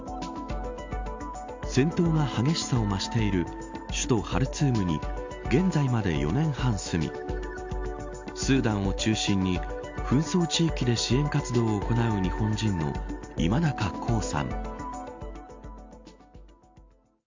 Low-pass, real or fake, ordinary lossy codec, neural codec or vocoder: 7.2 kHz; real; MP3, 64 kbps; none